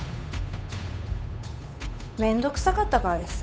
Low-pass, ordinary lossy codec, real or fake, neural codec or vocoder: none; none; fake; codec, 16 kHz, 8 kbps, FunCodec, trained on Chinese and English, 25 frames a second